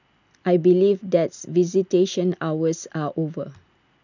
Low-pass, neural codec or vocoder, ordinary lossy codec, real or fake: 7.2 kHz; none; none; real